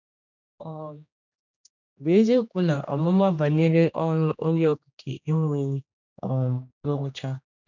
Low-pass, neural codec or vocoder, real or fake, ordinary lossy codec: 7.2 kHz; codec, 16 kHz, 1 kbps, X-Codec, HuBERT features, trained on general audio; fake; none